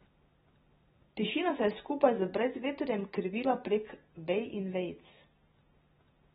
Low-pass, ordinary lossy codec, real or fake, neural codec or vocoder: 19.8 kHz; AAC, 16 kbps; real; none